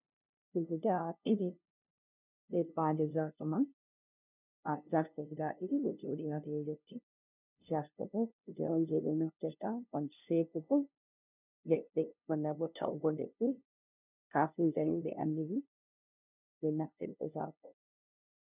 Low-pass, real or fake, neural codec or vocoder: 3.6 kHz; fake; codec, 16 kHz, 0.5 kbps, FunCodec, trained on LibriTTS, 25 frames a second